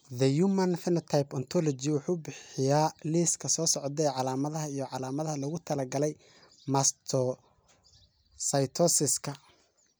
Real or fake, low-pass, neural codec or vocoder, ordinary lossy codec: real; none; none; none